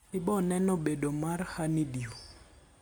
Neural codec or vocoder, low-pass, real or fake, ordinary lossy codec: none; none; real; none